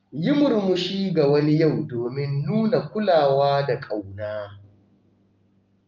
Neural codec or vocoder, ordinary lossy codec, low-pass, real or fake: none; Opus, 24 kbps; 7.2 kHz; real